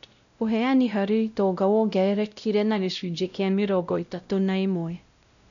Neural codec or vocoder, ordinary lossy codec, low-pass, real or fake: codec, 16 kHz, 0.5 kbps, X-Codec, WavLM features, trained on Multilingual LibriSpeech; none; 7.2 kHz; fake